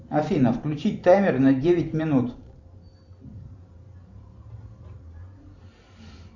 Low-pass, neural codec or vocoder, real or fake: 7.2 kHz; vocoder, 44.1 kHz, 128 mel bands every 512 samples, BigVGAN v2; fake